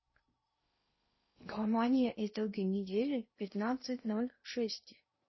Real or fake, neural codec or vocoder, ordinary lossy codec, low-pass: fake; codec, 16 kHz in and 24 kHz out, 0.6 kbps, FocalCodec, streaming, 4096 codes; MP3, 24 kbps; 7.2 kHz